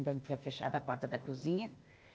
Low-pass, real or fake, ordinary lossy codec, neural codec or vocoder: none; fake; none; codec, 16 kHz, 0.8 kbps, ZipCodec